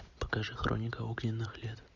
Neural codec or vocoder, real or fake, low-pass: none; real; 7.2 kHz